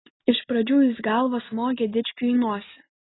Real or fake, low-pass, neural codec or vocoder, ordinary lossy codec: real; 7.2 kHz; none; AAC, 16 kbps